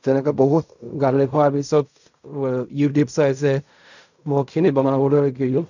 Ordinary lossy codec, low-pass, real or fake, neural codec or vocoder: none; 7.2 kHz; fake; codec, 16 kHz in and 24 kHz out, 0.4 kbps, LongCat-Audio-Codec, fine tuned four codebook decoder